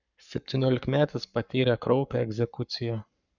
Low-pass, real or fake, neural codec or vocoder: 7.2 kHz; fake; codec, 44.1 kHz, 7.8 kbps, Pupu-Codec